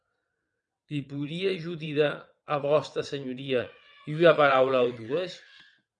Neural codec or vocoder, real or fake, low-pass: vocoder, 22.05 kHz, 80 mel bands, WaveNeXt; fake; 9.9 kHz